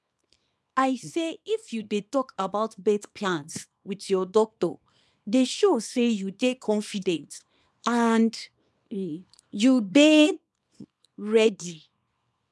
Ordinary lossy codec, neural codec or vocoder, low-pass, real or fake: none; codec, 24 kHz, 0.9 kbps, WavTokenizer, small release; none; fake